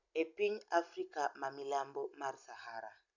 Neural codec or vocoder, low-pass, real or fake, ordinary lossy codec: none; 7.2 kHz; real; none